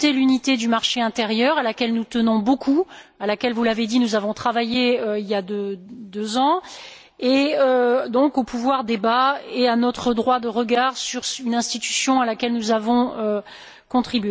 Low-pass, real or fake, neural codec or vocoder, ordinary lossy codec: none; real; none; none